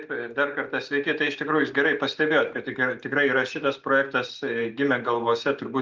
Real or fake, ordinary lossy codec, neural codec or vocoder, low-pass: real; Opus, 32 kbps; none; 7.2 kHz